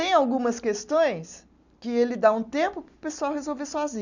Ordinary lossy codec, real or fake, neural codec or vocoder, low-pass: none; real; none; 7.2 kHz